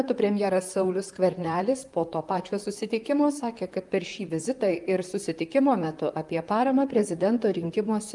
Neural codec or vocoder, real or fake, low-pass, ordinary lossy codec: vocoder, 44.1 kHz, 128 mel bands, Pupu-Vocoder; fake; 10.8 kHz; Opus, 32 kbps